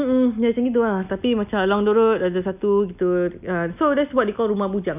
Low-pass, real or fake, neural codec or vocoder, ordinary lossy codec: 3.6 kHz; real; none; none